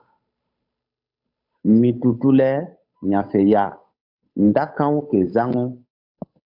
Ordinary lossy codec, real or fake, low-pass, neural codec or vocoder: AAC, 48 kbps; fake; 5.4 kHz; codec, 16 kHz, 8 kbps, FunCodec, trained on Chinese and English, 25 frames a second